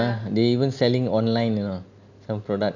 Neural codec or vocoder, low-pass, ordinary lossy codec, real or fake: none; 7.2 kHz; none; real